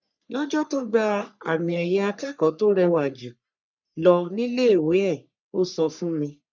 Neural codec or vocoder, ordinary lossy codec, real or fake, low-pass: codec, 44.1 kHz, 3.4 kbps, Pupu-Codec; none; fake; 7.2 kHz